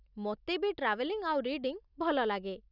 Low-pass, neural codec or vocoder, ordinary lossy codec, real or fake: 5.4 kHz; none; none; real